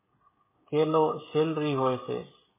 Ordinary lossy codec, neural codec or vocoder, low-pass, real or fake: MP3, 16 kbps; codec, 16 kHz, 16 kbps, FreqCodec, smaller model; 3.6 kHz; fake